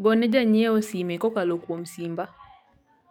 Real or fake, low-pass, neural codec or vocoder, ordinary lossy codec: fake; 19.8 kHz; codec, 44.1 kHz, 7.8 kbps, DAC; none